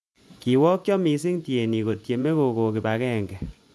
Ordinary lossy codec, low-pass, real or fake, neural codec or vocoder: none; none; fake; vocoder, 24 kHz, 100 mel bands, Vocos